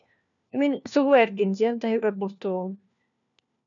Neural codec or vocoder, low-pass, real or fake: codec, 16 kHz, 1 kbps, FunCodec, trained on LibriTTS, 50 frames a second; 7.2 kHz; fake